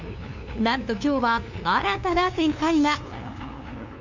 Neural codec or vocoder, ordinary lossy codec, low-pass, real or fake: codec, 16 kHz, 2 kbps, FunCodec, trained on LibriTTS, 25 frames a second; none; 7.2 kHz; fake